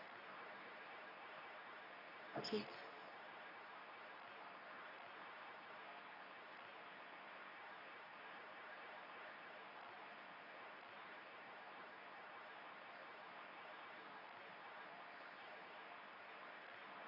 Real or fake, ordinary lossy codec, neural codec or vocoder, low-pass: fake; none; codec, 24 kHz, 0.9 kbps, WavTokenizer, medium speech release version 2; 5.4 kHz